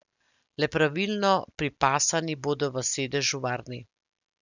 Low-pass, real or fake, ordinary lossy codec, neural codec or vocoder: 7.2 kHz; real; none; none